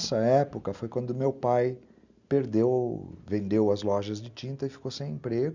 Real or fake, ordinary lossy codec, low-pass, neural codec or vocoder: real; Opus, 64 kbps; 7.2 kHz; none